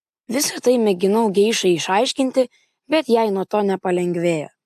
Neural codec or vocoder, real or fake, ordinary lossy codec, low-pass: none; real; AAC, 96 kbps; 14.4 kHz